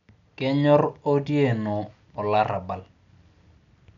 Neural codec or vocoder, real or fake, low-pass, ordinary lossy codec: none; real; 7.2 kHz; none